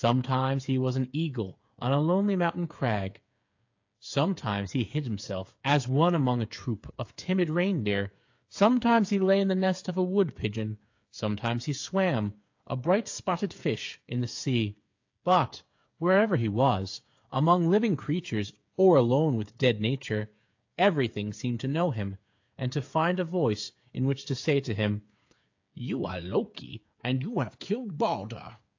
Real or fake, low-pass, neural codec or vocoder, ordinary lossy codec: fake; 7.2 kHz; codec, 16 kHz, 8 kbps, FreqCodec, smaller model; AAC, 48 kbps